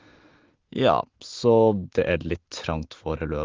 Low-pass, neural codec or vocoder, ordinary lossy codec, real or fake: 7.2 kHz; none; Opus, 16 kbps; real